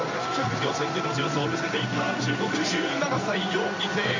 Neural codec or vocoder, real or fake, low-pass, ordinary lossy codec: codec, 16 kHz in and 24 kHz out, 1 kbps, XY-Tokenizer; fake; 7.2 kHz; MP3, 64 kbps